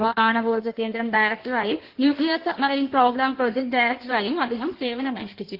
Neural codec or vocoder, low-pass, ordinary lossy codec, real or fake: codec, 16 kHz in and 24 kHz out, 1.1 kbps, FireRedTTS-2 codec; 5.4 kHz; Opus, 16 kbps; fake